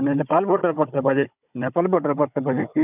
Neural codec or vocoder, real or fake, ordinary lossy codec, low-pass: codec, 16 kHz, 16 kbps, FunCodec, trained on Chinese and English, 50 frames a second; fake; none; 3.6 kHz